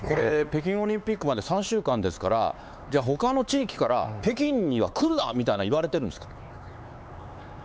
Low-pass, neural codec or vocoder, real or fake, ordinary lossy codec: none; codec, 16 kHz, 4 kbps, X-Codec, HuBERT features, trained on LibriSpeech; fake; none